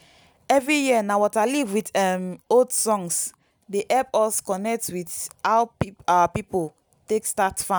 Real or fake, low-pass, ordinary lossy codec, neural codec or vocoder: real; none; none; none